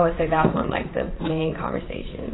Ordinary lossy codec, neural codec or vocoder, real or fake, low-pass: AAC, 16 kbps; vocoder, 44.1 kHz, 80 mel bands, Vocos; fake; 7.2 kHz